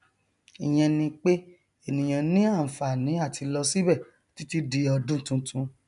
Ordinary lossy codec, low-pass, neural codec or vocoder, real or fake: none; 10.8 kHz; none; real